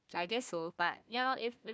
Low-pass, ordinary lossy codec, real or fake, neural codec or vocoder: none; none; fake; codec, 16 kHz, 1 kbps, FunCodec, trained on Chinese and English, 50 frames a second